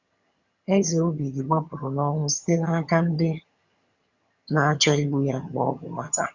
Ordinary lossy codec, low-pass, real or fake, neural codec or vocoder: Opus, 64 kbps; 7.2 kHz; fake; vocoder, 22.05 kHz, 80 mel bands, HiFi-GAN